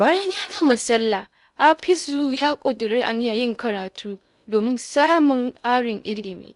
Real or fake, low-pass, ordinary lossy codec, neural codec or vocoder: fake; 10.8 kHz; none; codec, 16 kHz in and 24 kHz out, 0.6 kbps, FocalCodec, streaming, 2048 codes